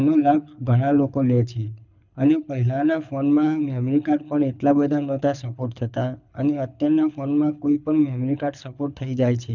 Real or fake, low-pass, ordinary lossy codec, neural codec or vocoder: fake; 7.2 kHz; none; codec, 24 kHz, 6 kbps, HILCodec